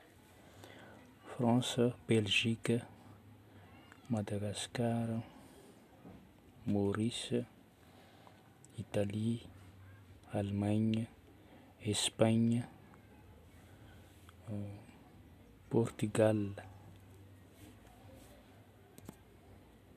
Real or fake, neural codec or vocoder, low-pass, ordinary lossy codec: real; none; 14.4 kHz; none